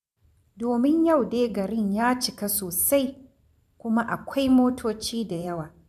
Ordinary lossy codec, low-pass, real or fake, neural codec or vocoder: none; 14.4 kHz; fake; vocoder, 44.1 kHz, 128 mel bands every 512 samples, BigVGAN v2